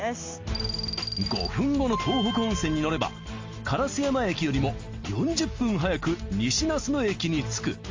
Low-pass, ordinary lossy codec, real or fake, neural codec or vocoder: 7.2 kHz; Opus, 32 kbps; real; none